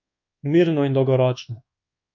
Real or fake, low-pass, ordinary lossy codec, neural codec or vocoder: fake; 7.2 kHz; none; codec, 24 kHz, 1.2 kbps, DualCodec